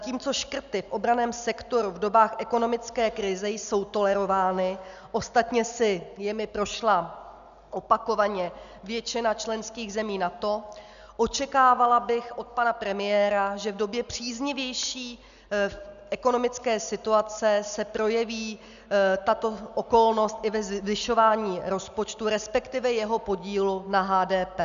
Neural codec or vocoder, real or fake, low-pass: none; real; 7.2 kHz